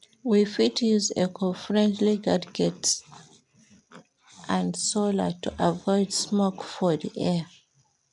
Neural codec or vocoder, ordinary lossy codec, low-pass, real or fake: none; none; 10.8 kHz; real